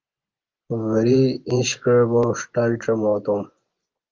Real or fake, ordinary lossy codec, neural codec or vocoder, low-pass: fake; Opus, 24 kbps; vocoder, 24 kHz, 100 mel bands, Vocos; 7.2 kHz